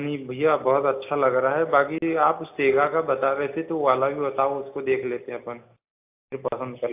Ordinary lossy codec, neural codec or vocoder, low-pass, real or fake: AAC, 32 kbps; none; 3.6 kHz; real